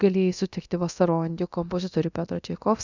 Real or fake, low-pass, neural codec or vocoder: fake; 7.2 kHz; codec, 24 kHz, 0.9 kbps, DualCodec